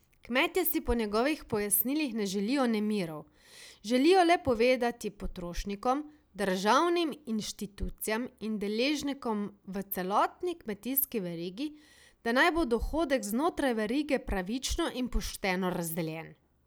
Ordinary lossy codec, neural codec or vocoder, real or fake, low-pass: none; none; real; none